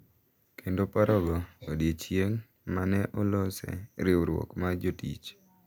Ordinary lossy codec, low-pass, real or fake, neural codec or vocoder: none; none; real; none